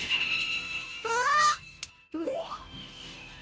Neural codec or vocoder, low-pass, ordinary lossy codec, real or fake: codec, 16 kHz, 0.5 kbps, FunCodec, trained on Chinese and English, 25 frames a second; none; none; fake